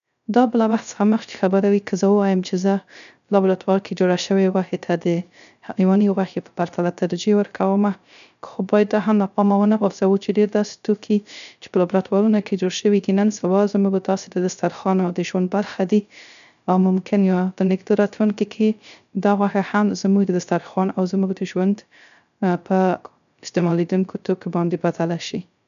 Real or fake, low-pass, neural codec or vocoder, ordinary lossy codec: fake; 7.2 kHz; codec, 16 kHz, 0.3 kbps, FocalCodec; none